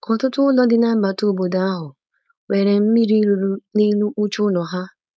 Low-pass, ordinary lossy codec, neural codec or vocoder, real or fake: none; none; codec, 16 kHz, 4.8 kbps, FACodec; fake